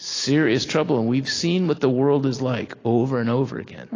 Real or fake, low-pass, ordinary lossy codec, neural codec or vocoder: real; 7.2 kHz; AAC, 32 kbps; none